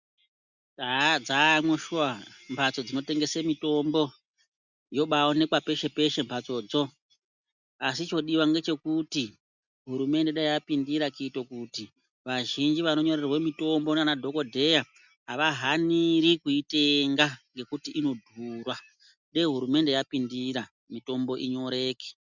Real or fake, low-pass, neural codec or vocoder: real; 7.2 kHz; none